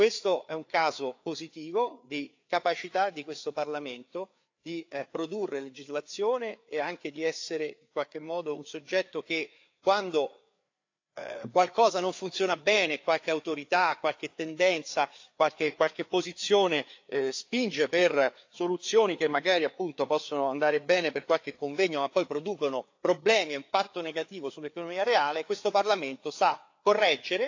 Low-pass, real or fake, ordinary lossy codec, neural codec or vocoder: 7.2 kHz; fake; AAC, 48 kbps; codec, 16 kHz, 4 kbps, FreqCodec, larger model